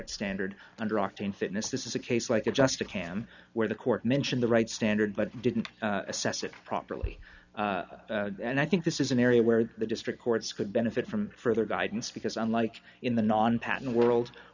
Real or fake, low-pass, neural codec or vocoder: real; 7.2 kHz; none